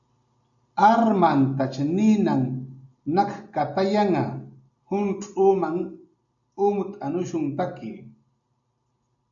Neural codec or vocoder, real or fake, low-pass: none; real; 7.2 kHz